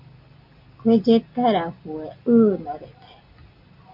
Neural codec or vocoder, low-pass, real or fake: none; 5.4 kHz; real